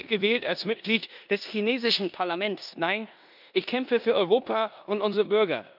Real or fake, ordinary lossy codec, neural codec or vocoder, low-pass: fake; none; codec, 16 kHz in and 24 kHz out, 0.9 kbps, LongCat-Audio-Codec, four codebook decoder; 5.4 kHz